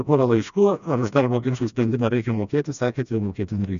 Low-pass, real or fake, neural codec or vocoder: 7.2 kHz; fake; codec, 16 kHz, 1 kbps, FreqCodec, smaller model